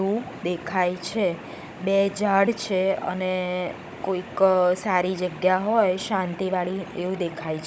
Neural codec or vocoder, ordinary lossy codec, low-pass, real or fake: codec, 16 kHz, 16 kbps, FunCodec, trained on Chinese and English, 50 frames a second; none; none; fake